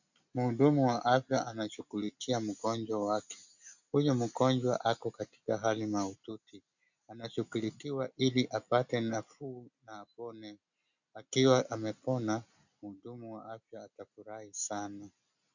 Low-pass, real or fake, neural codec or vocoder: 7.2 kHz; real; none